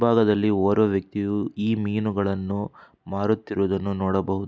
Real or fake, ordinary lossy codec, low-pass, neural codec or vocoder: real; none; none; none